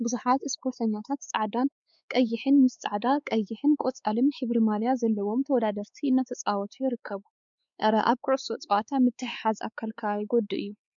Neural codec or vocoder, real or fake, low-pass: codec, 16 kHz, 4 kbps, X-Codec, WavLM features, trained on Multilingual LibriSpeech; fake; 7.2 kHz